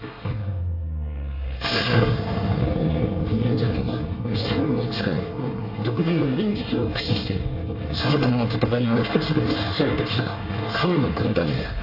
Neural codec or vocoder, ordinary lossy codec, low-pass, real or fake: codec, 24 kHz, 1 kbps, SNAC; AAC, 32 kbps; 5.4 kHz; fake